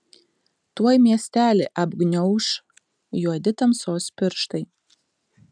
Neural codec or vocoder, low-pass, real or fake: none; 9.9 kHz; real